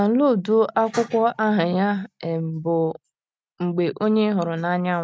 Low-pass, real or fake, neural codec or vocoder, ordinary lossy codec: none; real; none; none